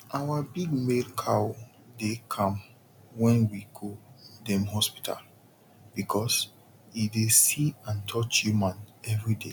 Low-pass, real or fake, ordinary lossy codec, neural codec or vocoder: 19.8 kHz; real; none; none